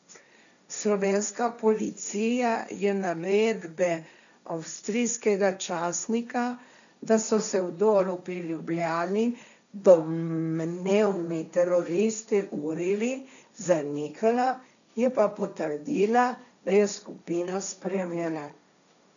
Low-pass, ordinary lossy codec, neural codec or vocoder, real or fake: 7.2 kHz; none; codec, 16 kHz, 1.1 kbps, Voila-Tokenizer; fake